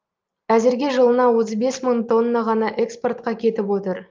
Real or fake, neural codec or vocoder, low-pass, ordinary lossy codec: real; none; 7.2 kHz; Opus, 32 kbps